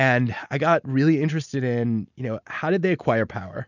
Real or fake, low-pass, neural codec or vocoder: real; 7.2 kHz; none